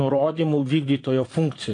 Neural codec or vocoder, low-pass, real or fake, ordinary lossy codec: vocoder, 22.05 kHz, 80 mel bands, Vocos; 9.9 kHz; fake; AAC, 48 kbps